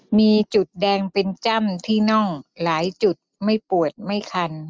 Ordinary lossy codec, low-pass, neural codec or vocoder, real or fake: none; none; none; real